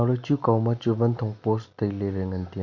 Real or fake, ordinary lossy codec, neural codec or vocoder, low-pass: real; none; none; 7.2 kHz